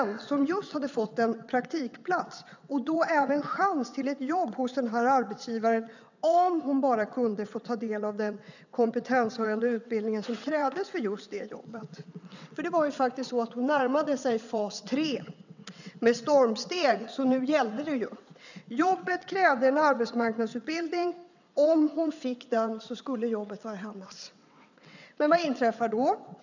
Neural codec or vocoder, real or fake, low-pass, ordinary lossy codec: vocoder, 22.05 kHz, 80 mel bands, WaveNeXt; fake; 7.2 kHz; none